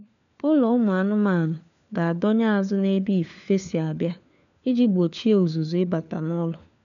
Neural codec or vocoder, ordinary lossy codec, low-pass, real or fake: codec, 16 kHz, 4 kbps, FunCodec, trained on LibriTTS, 50 frames a second; none; 7.2 kHz; fake